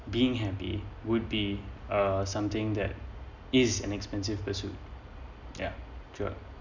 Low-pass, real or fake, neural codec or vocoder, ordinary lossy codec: 7.2 kHz; real; none; none